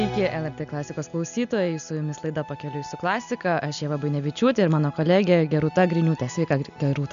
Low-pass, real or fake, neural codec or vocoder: 7.2 kHz; real; none